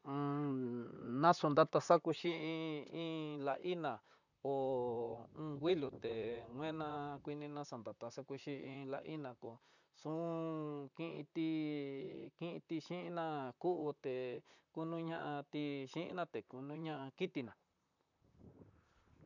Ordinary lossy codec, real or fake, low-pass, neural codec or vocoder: none; fake; 7.2 kHz; vocoder, 44.1 kHz, 128 mel bands, Pupu-Vocoder